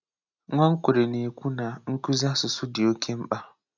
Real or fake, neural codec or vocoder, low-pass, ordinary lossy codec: real; none; 7.2 kHz; none